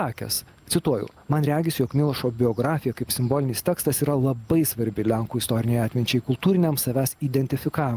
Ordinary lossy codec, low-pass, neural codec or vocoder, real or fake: Opus, 32 kbps; 14.4 kHz; none; real